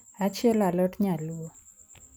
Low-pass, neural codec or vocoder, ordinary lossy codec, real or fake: none; none; none; real